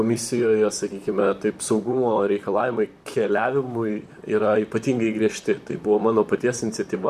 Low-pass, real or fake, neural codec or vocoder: 14.4 kHz; fake; vocoder, 44.1 kHz, 128 mel bands, Pupu-Vocoder